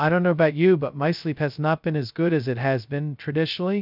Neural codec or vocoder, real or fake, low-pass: codec, 16 kHz, 0.2 kbps, FocalCodec; fake; 5.4 kHz